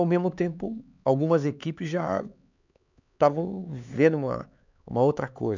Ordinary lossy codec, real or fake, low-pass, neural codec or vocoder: none; fake; 7.2 kHz; codec, 16 kHz, 4 kbps, X-Codec, HuBERT features, trained on LibriSpeech